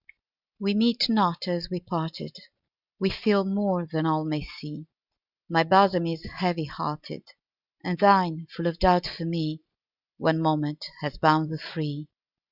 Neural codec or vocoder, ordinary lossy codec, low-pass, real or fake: none; Opus, 64 kbps; 5.4 kHz; real